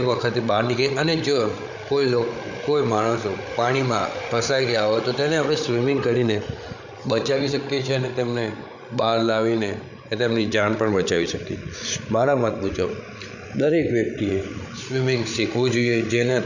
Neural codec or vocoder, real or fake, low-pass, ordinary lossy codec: codec, 16 kHz, 8 kbps, FreqCodec, larger model; fake; 7.2 kHz; none